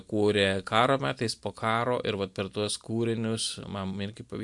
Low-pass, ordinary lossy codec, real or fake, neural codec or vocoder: 10.8 kHz; MP3, 64 kbps; real; none